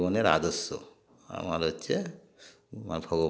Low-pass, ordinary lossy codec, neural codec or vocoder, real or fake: none; none; none; real